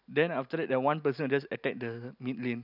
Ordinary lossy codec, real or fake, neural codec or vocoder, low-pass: none; real; none; 5.4 kHz